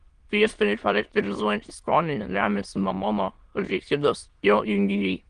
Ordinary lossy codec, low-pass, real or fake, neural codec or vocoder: Opus, 24 kbps; 9.9 kHz; fake; autoencoder, 22.05 kHz, a latent of 192 numbers a frame, VITS, trained on many speakers